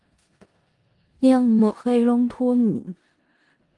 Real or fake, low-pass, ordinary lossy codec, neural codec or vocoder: fake; 10.8 kHz; Opus, 24 kbps; codec, 16 kHz in and 24 kHz out, 0.4 kbps, LongCat-Audio-Codec, four codebook decoder